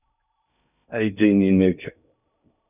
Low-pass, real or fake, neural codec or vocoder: 3.6 kHz; fake; codec, 16 kHz in and 24 kHz out, 0.6 kbps, FocalCodec, streaming, 2048 codes